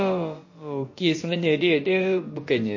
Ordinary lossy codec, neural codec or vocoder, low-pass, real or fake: MP3, 32 kbps; codec, 16 kHz, about 1 kbps, DyCAST, with the encoder's durations; 7.2 kHz; fake